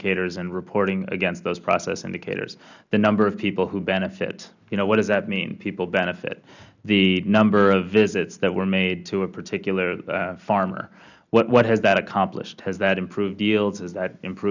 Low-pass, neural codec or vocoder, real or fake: 7.2 kHz; none; real